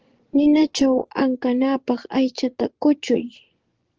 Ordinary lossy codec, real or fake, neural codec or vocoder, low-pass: Opus, 16 kbps; fake; vocoder, 24 kHz, 100 mel bands, Vocos; 7.2 kHz